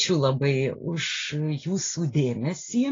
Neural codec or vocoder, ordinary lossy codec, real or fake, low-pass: none; AAC, 32 kbps; real; 7.2 kHz